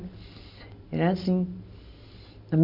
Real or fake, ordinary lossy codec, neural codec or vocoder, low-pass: real; none; none; 5.4 kHz